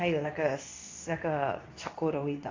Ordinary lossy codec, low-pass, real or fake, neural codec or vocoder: AAC, 32 kbps; 7.2 kHz; fake; codec, 24 kHz, 0.9 kbps, WavTokenizer, medium speech release version 2